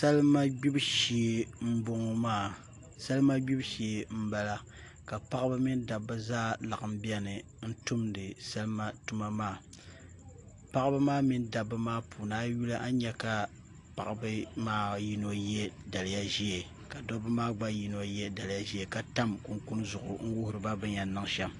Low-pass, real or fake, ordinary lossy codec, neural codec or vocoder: 10.8 kHz; real; AAC, 48 kbps; none